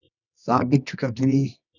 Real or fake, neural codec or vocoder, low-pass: fake; codec, 24 kHz, 0.9 kbps, WavTokenizer, medium music audio release; 7.2 kHz